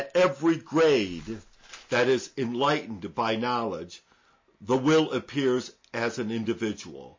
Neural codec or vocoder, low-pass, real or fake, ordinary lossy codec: none; 7.2 kHz; real; MP3, 32 kbps